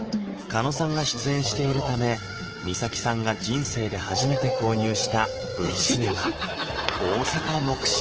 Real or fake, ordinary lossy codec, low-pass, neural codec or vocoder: fake; Opus, 16 kbps; 7.2 kHz; codec, 24 kHz, 3.1 kbps, DualCodec